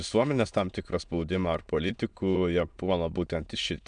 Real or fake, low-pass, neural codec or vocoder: fake; 9.9 kHz; autoencoder, 22.05 kHz, a latent of 192 numbers a frame, VITS, trained on many speakers